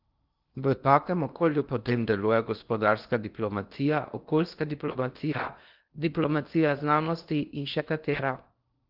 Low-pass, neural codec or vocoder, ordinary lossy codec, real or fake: 5.4 kHz; codec, 16 kHz in and 24 kHz out, 0.8 kbps, FocalCodec, streaming, 65536 codes; Opus, 32 kbps; fake